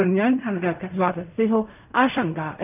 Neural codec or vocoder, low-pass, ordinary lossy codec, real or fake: codec, 16 kHz in and 24 kHz out, 0.4 kbps, LongCat-Audio-Codec, fine tuned four codebook decoder; 3.6 kHz; none; fake